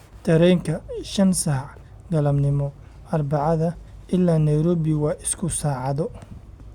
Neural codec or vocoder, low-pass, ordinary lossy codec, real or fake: none; 19.8 kHz; Opus, 64 kbps; real